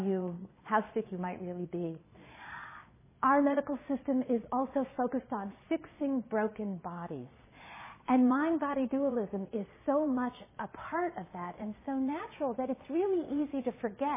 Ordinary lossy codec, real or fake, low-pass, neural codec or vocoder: MP3, 16 kbps; fake; 3.6 kHz; vocoder, 22.05 kHz, 80 mel bands, Vocos